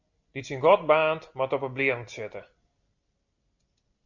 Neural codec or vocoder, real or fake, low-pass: none; real; 7.2 kHz